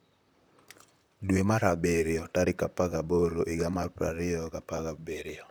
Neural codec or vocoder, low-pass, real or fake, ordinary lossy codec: vocoder, 44.1 kHz, 128 mel bands, Pupu-Vocoder; none; fake; none